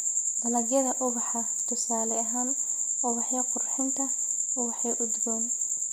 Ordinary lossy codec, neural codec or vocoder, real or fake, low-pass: none; none; real; none